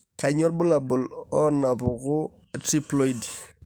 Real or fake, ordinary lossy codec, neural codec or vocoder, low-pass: fake; none; codec, 44.1 kHz, 7.8 kbps, DAC; none